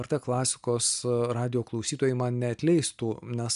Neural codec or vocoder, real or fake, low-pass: none; real; 10.8 kHz